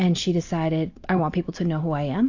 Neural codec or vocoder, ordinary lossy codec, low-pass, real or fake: none; AAC, 48 kbps; 7.2 kHz; real